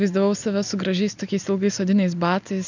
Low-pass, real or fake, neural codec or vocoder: 7.2 kHz; real; none